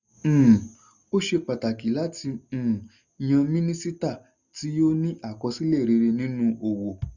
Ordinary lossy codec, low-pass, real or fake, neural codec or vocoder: none; 7.2 kHz; real; none